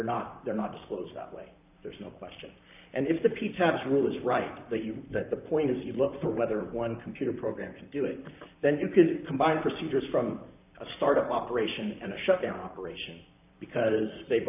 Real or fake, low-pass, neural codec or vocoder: fake; 3.6 kHz; vocoder, 44.1 kHz, 128 mel bands, Pupu-Vocoder